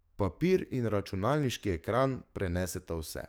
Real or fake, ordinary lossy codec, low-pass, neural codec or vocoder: fake; none; none; codec, 44.1 kHz, 7.8 kbps, DAC